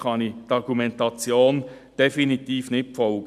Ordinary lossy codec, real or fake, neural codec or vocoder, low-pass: none; real; none; 14.4 kHz